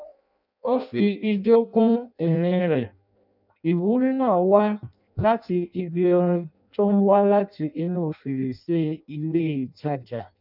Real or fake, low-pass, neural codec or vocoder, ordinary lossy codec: fake; 5.4 kHz; codec, 16 kHz in and 24 kHz out, 0.6 kbps, FireRedTTS-2 codec; none